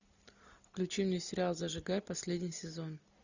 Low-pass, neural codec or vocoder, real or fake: 7.2 kHz; none; real